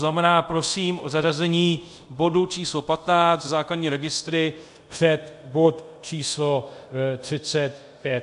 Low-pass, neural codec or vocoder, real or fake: 10.8 kHz; codec, 24 kHz, 0.5 kbps, DualCodec; fake